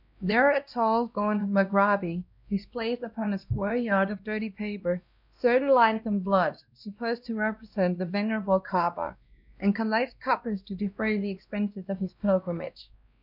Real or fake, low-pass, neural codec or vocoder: fake; 5.4 kHz; codec, 16 kHz, 1 kbps, X-Codec, WavLM features, trained on Multilingual LibriSpeech